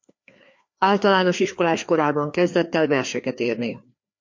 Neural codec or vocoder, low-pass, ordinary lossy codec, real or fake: codec, 16 kHz, 2 kbps, FreqCodec, larger model; 7.2 kHz; MP3, 48 kbps; fake